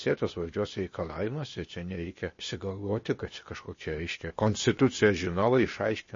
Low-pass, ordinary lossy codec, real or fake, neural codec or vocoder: 7.2 kHz; MP3, 32 kbps; fake; codec, 16 kHz, 0.8 kbps, ZipCodec